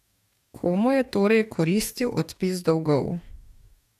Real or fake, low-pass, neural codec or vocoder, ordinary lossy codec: fake; 14.4 kHz; codec, 44.1 kHz, 2.6 kbps, DAC; none